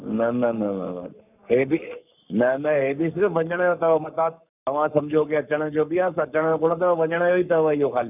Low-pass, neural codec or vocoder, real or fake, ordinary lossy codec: 3.6 kHz; codec, 44.1 kHz, 7.8 kbps, Pupu-Codec; fake; none